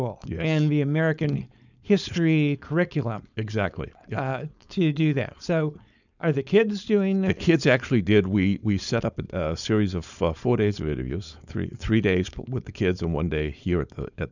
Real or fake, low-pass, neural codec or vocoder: fake; 7.2 kHz; codec, 16 kHz, 4.8 kbps, FACodec